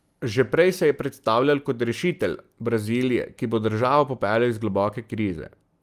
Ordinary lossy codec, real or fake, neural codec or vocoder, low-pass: Opus, 32 kbps; real; none; 14.4 kHz